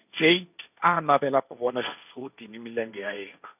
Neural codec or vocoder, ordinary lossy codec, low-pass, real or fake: codec, 16 kHz, 1.1 kbps, Voila-Tokenizer; none; 3.6 kHz; fake